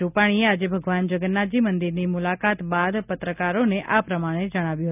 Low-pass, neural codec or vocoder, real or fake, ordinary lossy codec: 3.6 kHz; none; real; none